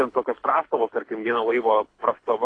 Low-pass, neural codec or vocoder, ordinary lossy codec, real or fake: 9.9 kHz; codec, 24 kHz, 6 kbps, HILCodec; AAC, 32 kbps; fake